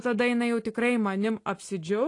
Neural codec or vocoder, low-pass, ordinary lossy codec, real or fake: none; 10.8 kHz; AAC, 48 kbps; real